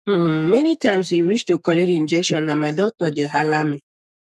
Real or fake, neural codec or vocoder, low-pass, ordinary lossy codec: fake; codec, 32 kHz, 1.9 kbps, SNAC; 14.4 kHz; none